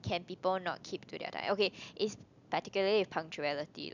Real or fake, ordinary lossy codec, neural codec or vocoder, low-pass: real; none; none; 7.2 kHz